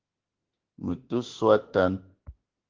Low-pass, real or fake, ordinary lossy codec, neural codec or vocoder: 7.2 kHz; fake; Opus, 16 kbps; autoencoder, 48 kHz, 32 numbers a frame, DAC-VAE, trained on Japanese speech